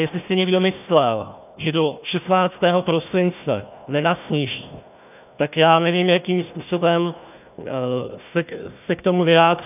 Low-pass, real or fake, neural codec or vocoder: 3.6 kHz; fake; codec, 16 kHz, 1 kbps, FunCodec, trained on Chinese and English, 50 frames a second